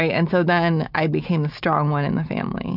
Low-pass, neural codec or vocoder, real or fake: 5.4 kHz; none; real